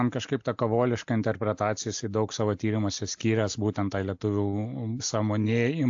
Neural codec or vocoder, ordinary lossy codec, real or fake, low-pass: none; AAC, 64 kbps; real; 7.2 kHz